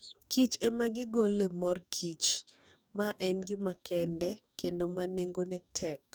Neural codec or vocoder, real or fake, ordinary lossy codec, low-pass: codec, 44.1 kHz, 2.6 kbps, DAC; fake; none; none